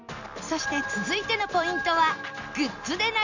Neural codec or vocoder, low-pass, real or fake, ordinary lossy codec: none; 7.2 kHz; real; none